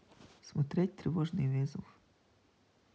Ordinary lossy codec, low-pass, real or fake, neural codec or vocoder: none; none; real; none